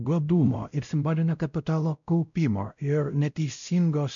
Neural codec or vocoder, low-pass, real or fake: codec, 16 kHz, 0.5 kbps, X-Codec, WavLM features, trained on Multilingual LibriSpeech; 7.2 kHz; fake